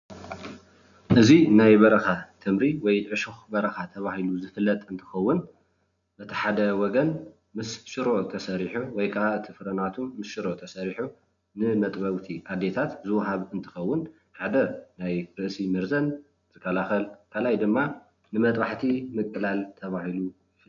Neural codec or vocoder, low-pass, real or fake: none; 7.2 kHz; real